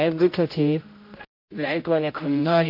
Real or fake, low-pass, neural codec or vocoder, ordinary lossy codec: fake; 5.4 kHz; codec, 16 kHz, 0.5 kbps, X-Codec, HuBERT features, trained on general audio; MP3, 32 kbps